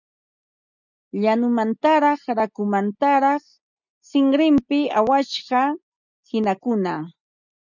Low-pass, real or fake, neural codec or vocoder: 7.2 kHz; real; none